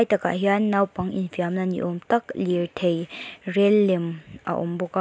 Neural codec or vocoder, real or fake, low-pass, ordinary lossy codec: none; real; none; none